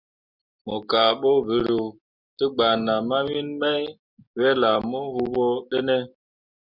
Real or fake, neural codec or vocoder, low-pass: real; none; 5.4 kHz